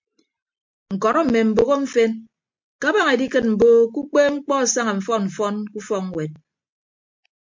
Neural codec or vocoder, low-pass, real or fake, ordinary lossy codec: none; 7.2 kHz; real; MP3, 48 kbps